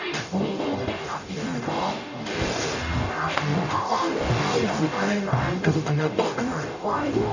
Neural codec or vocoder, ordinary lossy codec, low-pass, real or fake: codec, 44.1 kHz, 0.9 kbps, DAC; none; 7.2 kHz; fake